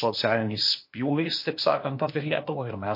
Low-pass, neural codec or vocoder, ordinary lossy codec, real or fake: 5.4 kHz; codec, 16 kHz, 0.8 kbps, ZipCodec; MP3, 32 kbps; fake